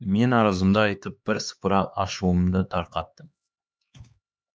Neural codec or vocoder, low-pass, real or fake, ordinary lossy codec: codec, 16 kHz, 2 kbps, X-Codec, HuBERT features, trained on LibriSpeech; none; fake; none